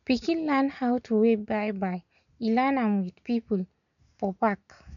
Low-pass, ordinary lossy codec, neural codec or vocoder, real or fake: 7.2 kHz; none; none; real